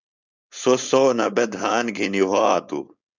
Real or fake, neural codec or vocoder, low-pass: fake; codec, 16 kHz, 4.8 kbps, FACodec; 7.2 kHz